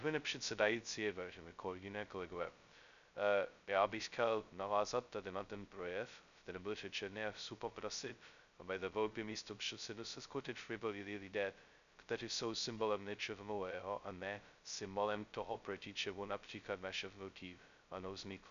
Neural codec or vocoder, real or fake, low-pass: codec, 16 kHz, 0.2 kbps, FocalCodec; fake; 7.2 kHz